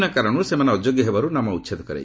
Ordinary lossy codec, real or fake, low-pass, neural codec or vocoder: none; real; none; none